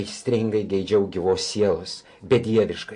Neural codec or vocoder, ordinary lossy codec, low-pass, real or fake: none; MP3, 48 kbps; 10.8 kHz; real